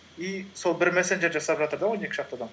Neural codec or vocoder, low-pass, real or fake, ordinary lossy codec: none; none; real; none